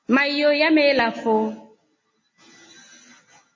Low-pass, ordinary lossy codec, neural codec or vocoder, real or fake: 7.2 kHz; MP3, 32 kbps; none; real